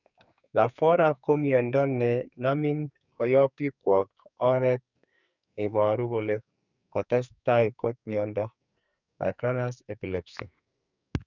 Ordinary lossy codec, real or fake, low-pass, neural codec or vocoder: none; fake; 7.2 kHz; codec, 44.1 kHz, 2.6 kbps, SNAC